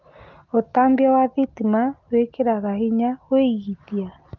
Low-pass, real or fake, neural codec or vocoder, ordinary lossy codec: 7.2 kHz; real; none; Opus, 24 kbps